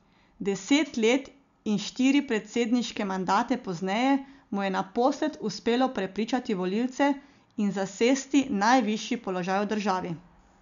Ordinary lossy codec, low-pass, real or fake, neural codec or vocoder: none; 7.2 kHz; real; none